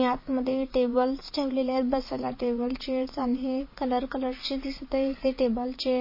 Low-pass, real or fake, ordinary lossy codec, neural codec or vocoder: 5.4 kHz; fake; MP3, 24 kbps; vocoder, 44.1 kHz, 80 mel bands, Vocos